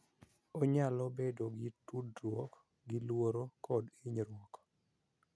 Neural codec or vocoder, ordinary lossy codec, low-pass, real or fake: none; none; none; real